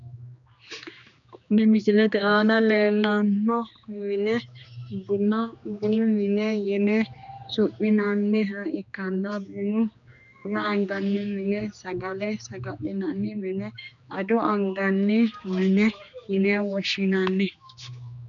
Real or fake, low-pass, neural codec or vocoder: fake; 7.2 kHz; codec, 16 kHz, 2 kbps, X-Codec, HuBERT features, trained on general audio